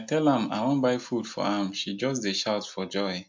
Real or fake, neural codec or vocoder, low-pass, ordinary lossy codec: real; none; 7.2 kHz; MP3, 64 kbps